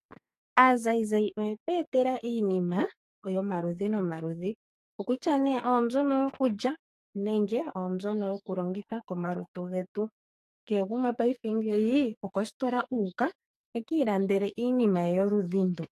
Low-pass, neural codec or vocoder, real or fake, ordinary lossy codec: 14.4 kHz; codec, 44.1 kHz, 2.6 kbps, SNAC; fake; AAC, 64 kbps